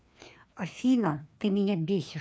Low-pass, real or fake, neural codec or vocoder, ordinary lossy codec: none; fake; codec, 16 kHz, 2 kbps, FreqCodec, larger model; none